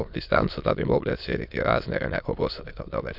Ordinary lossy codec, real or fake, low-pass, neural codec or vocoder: none; fake; 5.4 kHz; autoencoder, 22.05 kHz, a latent of 192 numbers a frame, VITS, trained on many speakers